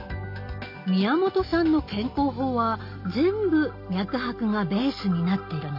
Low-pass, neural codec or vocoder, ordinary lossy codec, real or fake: 5.4 kHz; none; none; real